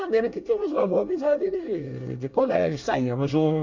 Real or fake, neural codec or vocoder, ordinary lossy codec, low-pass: fake; codec, 24 kHz, 1 kbps, SNAC; MP3, 64 kbps; 7.2 kHz